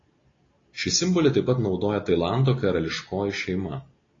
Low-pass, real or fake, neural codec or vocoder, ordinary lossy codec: 7.2 kHz; real; none; AAC, 32 kbps